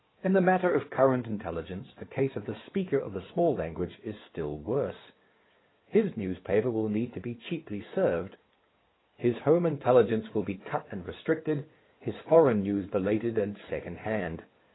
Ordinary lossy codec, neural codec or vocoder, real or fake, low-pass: AAC, 16 kbps; codec, 16 kHz in and 24 kHz out, 2.2 kbps, FireRedTTS-2 codec; fake; 7.2 kHz